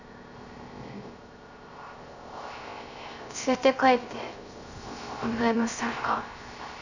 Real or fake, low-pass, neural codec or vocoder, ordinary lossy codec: fake; 7.2 kHz; codec, 16 kHz, 0.3 kbps, FocalCodec; none